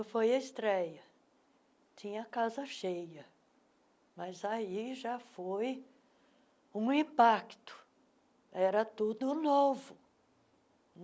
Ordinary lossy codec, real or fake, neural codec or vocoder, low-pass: none; real; none; none